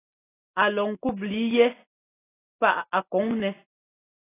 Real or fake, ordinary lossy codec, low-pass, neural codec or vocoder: real; AAC, 16 kbps; 3.6 kHz; none